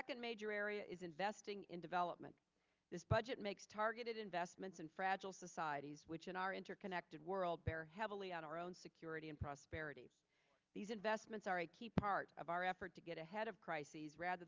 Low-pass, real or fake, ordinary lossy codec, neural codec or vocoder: 7.2 kHz; real; Opus, 24 kbps; none